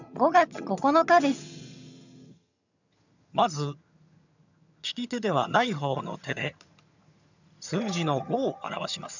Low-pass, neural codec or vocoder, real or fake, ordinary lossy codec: 7.2 kHz; vocoder, 22.05 kHz, 80 mel bands, HiFi-GAN; fake; none